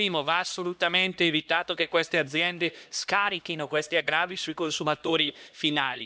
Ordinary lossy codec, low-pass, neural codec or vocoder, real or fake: none; none; codec, 16 kHz, 1 kbps, X-Codec, HuBERT features, trained on LibriSpeech; fake